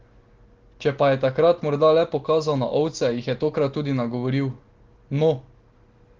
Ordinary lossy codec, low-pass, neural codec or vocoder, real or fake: Opus, 16 kbps; 7.2 kHz; none; real